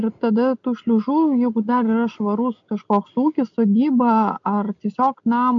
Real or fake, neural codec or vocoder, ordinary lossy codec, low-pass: real; none; AAC, 64 kbps; 7.2 kHz